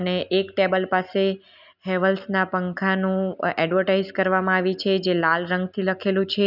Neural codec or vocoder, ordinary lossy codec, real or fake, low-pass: none; none; real; 5.4 kHz